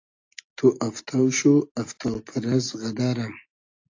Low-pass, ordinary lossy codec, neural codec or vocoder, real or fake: 7.2 kHz; AAC, 48 kbps; none; real